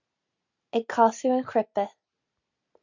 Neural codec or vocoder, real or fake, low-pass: none; real; 7.2 kHz